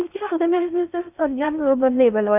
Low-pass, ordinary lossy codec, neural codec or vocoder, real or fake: 3.6 kHz; none; codec, 16 kHz in and 24 kHz out, 0.6 kbps, FocalCodec, streaming, 4096 codes; fake